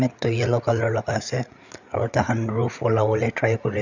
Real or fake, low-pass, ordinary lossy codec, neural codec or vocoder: fake; 7.2 kHz; none; codec, 16 kHz, 8 kbps, FreqCodec, larger model